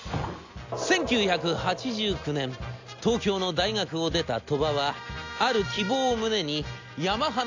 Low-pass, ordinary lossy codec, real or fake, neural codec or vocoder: 7.2 kHz; AAC, 48 kbps; real; none